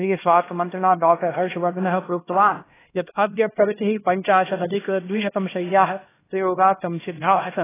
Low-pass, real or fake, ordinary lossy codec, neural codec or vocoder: 3.6 kHz; fake; AAC, 16 kbps; codec, 16 kHz, 1 kbps, X-Codec, HuBERT features, trained on LibriSpeech